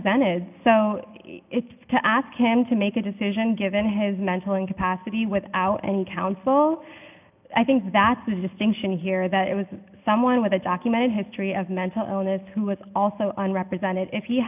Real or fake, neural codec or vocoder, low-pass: real; none; 3.6 kHz